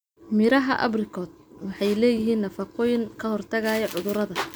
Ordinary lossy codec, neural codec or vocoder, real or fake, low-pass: none; none; real; none